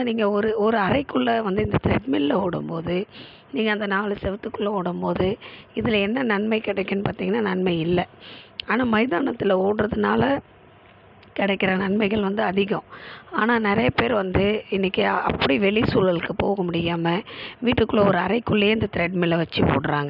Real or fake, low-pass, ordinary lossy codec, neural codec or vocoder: real; 5.4 kHz; none; none